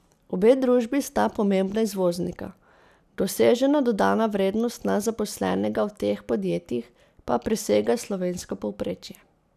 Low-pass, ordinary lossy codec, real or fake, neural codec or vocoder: 14.4 kHz; none; real; none